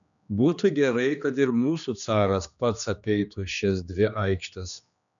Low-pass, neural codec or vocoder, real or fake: 7.2 kHz; codec, 16 kHz, 2 kbps, X-Codec, HuBERT features, trained on general audio; fake